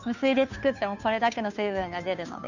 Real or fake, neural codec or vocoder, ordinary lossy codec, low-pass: fake; codec, 16 kHz, 2 kbps, FunCodec, trained on Chinese and English, 25 frames a second; none; 7.2 kHz